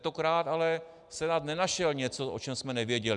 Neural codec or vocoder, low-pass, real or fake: none; 10.8 kHz; real